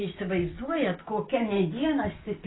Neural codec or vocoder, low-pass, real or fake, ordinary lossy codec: none; 7.2 kHz; real; AAC, 16 kbps